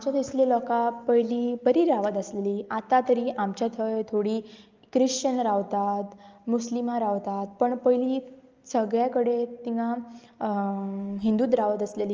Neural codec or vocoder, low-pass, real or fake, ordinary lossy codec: none; 7.2 kHz; real; Opus, 24 kbps